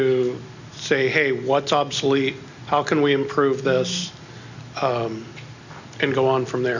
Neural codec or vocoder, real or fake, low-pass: none; real; 7.2 kHz